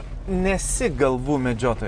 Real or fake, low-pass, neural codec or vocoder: real; 9.9 kHz; none